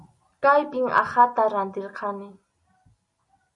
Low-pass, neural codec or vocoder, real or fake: 10.8 kHz; none; real